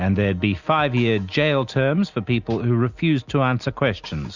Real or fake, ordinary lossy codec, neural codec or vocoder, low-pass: real; Opus, 64 kbps; none; 7.2 kHz